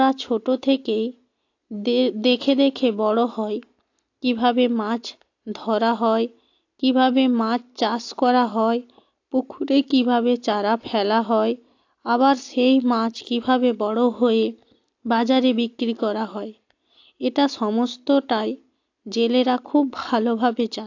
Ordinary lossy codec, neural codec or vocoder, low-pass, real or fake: AAC, 48 kbps; none; 7.2 kHz; real